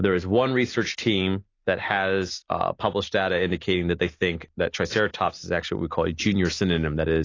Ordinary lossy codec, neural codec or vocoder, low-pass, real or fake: AAC, 32 kbps; none; 7.2 kHz; real